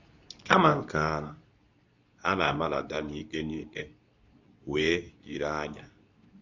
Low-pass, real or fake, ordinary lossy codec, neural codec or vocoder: 7.2 kHz; fake; none; codec, 24 kHz, 0.9 kbps, WavTokenizer, medium speech release version 2